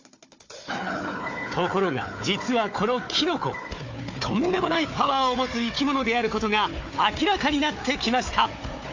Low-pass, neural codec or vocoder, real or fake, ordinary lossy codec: 7.2 kHz; codec, 16 kHz, 4 kbps, FunCodec, trained on Chinese and English, 50 frames a second; fake; AAC, 48 kbps